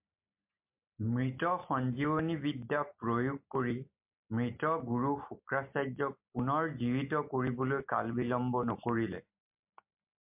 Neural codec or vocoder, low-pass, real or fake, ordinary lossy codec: none; 3.6 kHz; real; MP3, 32 kbps